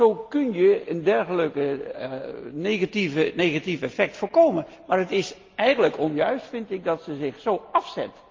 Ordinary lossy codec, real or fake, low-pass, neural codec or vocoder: Opus, 24 kbps; real; 7.2 kHz; none